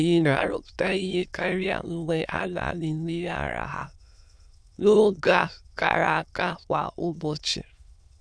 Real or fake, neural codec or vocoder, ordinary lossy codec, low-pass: fake; autoencoder, 22.05 kHz, a latent of 192 numbers a frame, VITS, trained on many speakers; none; none